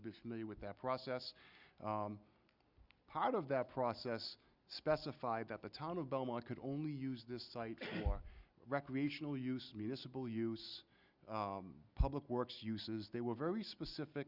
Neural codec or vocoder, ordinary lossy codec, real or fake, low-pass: none; Opus, 64 kbps; real; 5.4 kHz